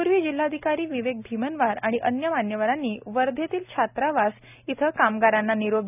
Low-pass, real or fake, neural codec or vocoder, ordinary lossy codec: 3.6 kHz; real; none; none